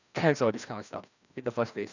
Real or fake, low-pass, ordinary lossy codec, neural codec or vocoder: fake; 7.2 kHz; none; codec, 16 kHz, 1 kbps, FreqCodec, larger model